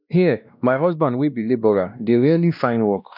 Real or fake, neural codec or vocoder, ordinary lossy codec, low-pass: fake; codec, 16 kHz, 1 kbps, X-Codec, WavLM features, trained on Multilingual LibriSpeech; none; 5.4 kHz